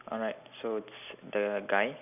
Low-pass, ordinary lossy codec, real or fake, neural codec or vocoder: 3.6 kHz; none; real; none